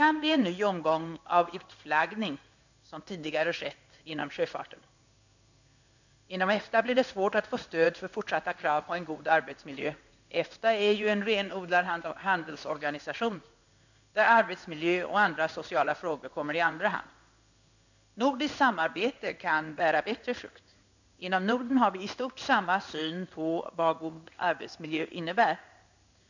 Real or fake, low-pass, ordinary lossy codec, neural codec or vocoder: fake; 7.2 kHz; none; codec, 16 kHz in and 24 kHz out, 1 kbps, XY-Tokenizer